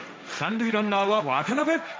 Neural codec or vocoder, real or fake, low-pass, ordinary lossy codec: codec, 16 kHz, 1.1 kbps, Voila-Tokenizer; fake; none; none